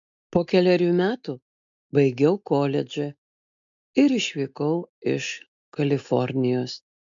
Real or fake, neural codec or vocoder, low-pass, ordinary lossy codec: real; none; 7.2 kHz; MP3, 64 kbps